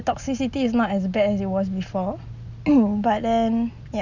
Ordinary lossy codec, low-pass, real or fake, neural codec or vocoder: none; 7.2 kHz; real; none